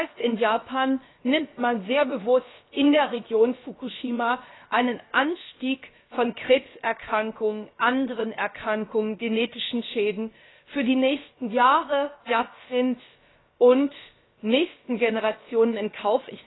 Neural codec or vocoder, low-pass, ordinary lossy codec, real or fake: codec, 16 kHz, about 1 kbps, DyCAST, with the encoder's durations; 7.2 kHz; AAC, 16 kbps; fake